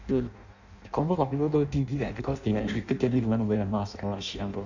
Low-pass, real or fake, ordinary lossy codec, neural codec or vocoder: 7.2 kHz; fake; none; codec, 16 kHz in and 24 kHz out, 0.6 kbps, FireRedTTS-2 codec